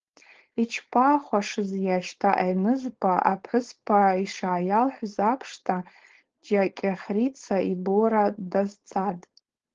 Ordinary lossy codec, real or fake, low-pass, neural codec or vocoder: Opus, 16 kbps; fake; 7.2 kHz; codec, 16 kHz, 4.8 kbps, FACodec